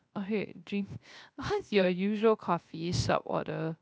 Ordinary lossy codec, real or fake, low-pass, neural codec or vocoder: none; fake; none; codec, 16 kHz, 0.3 kbps, FocalCodec